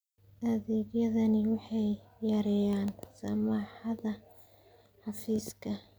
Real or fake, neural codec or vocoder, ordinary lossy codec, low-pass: real; none; none; none